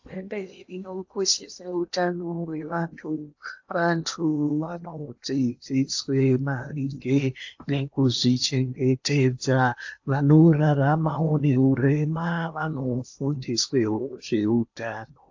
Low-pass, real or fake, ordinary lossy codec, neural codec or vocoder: 7.2 kHz; fake; AAC, 48 kbps; codec, 16 kHz in and 24 kHz out, 0.8 kbps, FocalCodec, streaming, 65536 codes